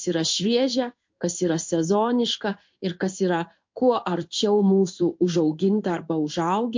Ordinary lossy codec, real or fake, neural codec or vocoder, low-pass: MP3, 48 kbps; fake; codec, 16 kHz in and 24 kHz out, 1 kbps, XY-Tokenizer; 7.2 kHz